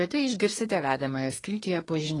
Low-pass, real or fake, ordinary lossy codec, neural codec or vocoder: 10.8 kHz; fake; AAC, 32 kbps; codec, 24 kHz, 1 kbps, SNAC